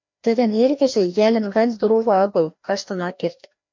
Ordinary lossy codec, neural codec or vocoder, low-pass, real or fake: MP3, 48 kbps; codec, 16 kHz, 1 kbps, FreqCodec, larger model; 7.2 kHz; fake